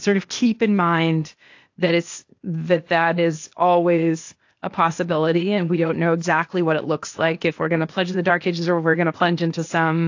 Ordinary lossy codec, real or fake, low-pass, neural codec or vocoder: AAC, 48 kbps; fake; 7.2 kHz; codec, 16 kHz, 0.8 kbps, ZipCodec